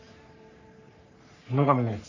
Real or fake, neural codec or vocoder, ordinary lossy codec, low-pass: fake; codec, 44.1 kHz, 3.4 kbps, Pupu-Codec; none; 7.2 kHz